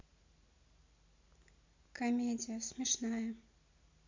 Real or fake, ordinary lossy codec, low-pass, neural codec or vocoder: real; MP3, 48 kbps; 7.2 kHz; none